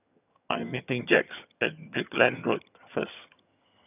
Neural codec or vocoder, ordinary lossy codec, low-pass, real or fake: vocoder, 22.05 kHz, 80 mel bands, HiFi-GAN; none; 3.6 kHz; fake